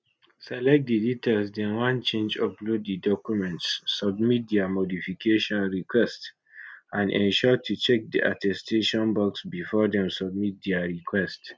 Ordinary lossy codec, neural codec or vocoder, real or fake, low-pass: none; none; real; none